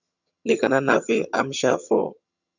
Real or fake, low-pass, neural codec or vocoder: fake; 7.2 kHz; vocoder, 22.05 kHz, 80 mel bands, HiFi-GAN